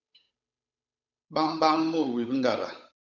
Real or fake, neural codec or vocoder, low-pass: fake; codec, 16 kHz, 8 kbps, FunCodec, trained on Chinese and English, 25 frames a second; 7.2 kHz